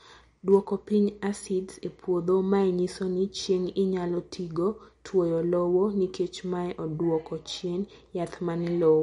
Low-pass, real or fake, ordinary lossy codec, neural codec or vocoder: 19.8 kHz; real; MP3, 48 kbps; none